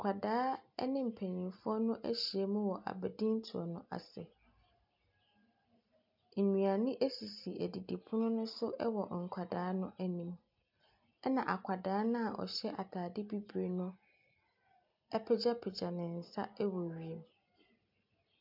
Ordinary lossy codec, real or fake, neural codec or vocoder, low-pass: MP3, 48 kbps; real; none; 5.4 kHz